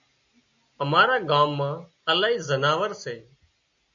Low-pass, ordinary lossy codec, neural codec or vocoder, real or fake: 7.2 kHz; AAC, 64 kbps; none; real